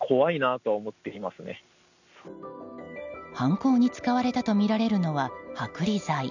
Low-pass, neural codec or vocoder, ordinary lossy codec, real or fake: 7.2 kHz; none; none; real